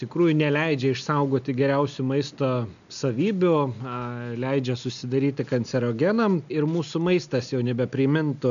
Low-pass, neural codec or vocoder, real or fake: 7.2 kHz; none; real